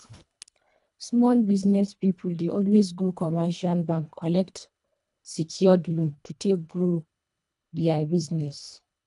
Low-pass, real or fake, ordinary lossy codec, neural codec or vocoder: 10.8 kHz; fake; none; codec, 24 kHz, 1.5 kbps, HILCodec